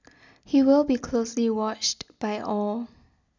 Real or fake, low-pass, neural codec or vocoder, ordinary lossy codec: real; 7.2 kHz; none; none